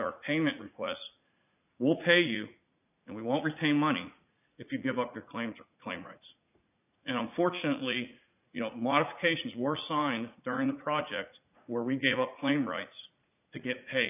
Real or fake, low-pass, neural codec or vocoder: fake; 3.6 kHz; vocoder, 44.1 kHz, 80 mel bands, Vocos